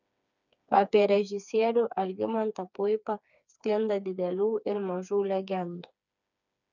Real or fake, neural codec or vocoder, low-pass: fake; codec, 16 kHz, 4 kbps, FreqCodec, smaller model; 7.2 kHz